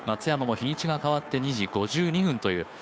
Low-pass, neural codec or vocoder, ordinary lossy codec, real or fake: none; codec, 16 kHz, 2 kbps, FunCodec, trained on Chinese and English, 25 frames a second; none; fake